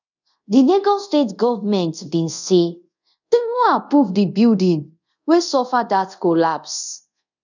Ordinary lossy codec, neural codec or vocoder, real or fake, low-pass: none; codec, 24 kHz, 0.5 kbps, DualCodec; fake; 7.2 kHz